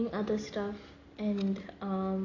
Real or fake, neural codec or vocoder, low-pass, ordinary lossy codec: real; none; 7.2 kHz; AAC, 48 kbps